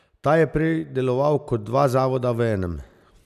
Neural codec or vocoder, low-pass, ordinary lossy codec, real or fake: none; 14.4 kHz; none; real